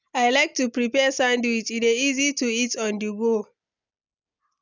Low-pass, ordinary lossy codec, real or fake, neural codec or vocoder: 7.2 kHz; none; real; none